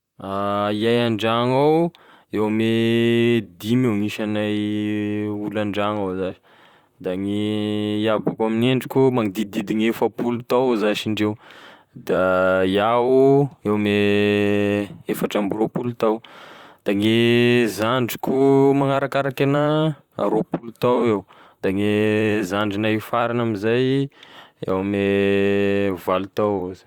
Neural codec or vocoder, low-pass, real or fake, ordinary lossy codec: vocoder, 44.1 kHz, 128 mel bands, Pupu-Vocoder; 19.8 kHz; fake; Opus, 64 kbps